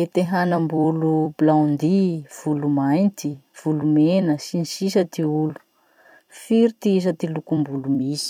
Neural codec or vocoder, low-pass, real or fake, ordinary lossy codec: vocoder, 44.1 kHz, 128 mel bands every 256 samples, BigVGAN v2; 19.8 kHz; fake; none